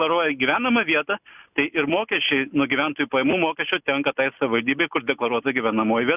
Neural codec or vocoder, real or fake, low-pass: none; real; 3.6 kHz